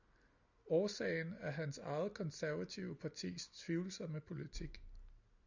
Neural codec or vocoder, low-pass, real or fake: none; 7.2 kHz; real